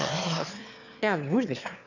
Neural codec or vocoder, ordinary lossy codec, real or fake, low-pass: autoencoder, 22.05 kHz, a latent of 192 numbers a frame, VITS, trained on one speaker; none; fake; 7.2 kHz